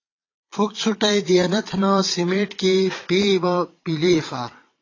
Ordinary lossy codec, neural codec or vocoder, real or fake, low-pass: AAC, 32 kbps; vocoder, 22.05 kHz, 80 mel bands, Vocos; fake; 7.2 kHz